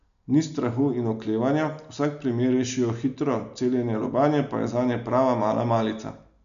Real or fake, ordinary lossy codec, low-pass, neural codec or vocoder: real; none; 7.2 kHz; none